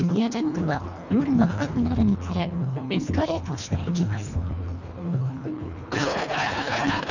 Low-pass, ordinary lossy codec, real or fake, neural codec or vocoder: 7.2 kHz; none; fake; codec, 24 kHz, 1.5 kbps, HILCodec